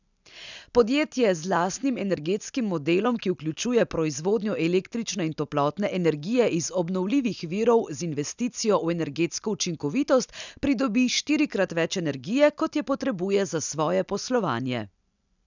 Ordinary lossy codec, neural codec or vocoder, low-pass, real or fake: none; none; 7.2 kHz; real